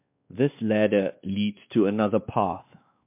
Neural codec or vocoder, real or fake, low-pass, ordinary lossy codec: codec, 16 kHz, 2 kbps, X-Codec, WavLM features, trained on Multilingual LibriSpeech; fake; 3.6 kHz; MP3, 32 kbps